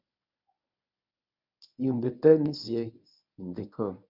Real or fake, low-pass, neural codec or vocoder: fake; 5.4 kHz; codec, 24 kHz, 0.9 kbps, WavTokenizer, medium speech release version 1